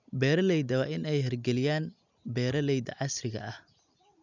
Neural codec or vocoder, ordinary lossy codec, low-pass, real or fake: none; none; 7.2 kHz; real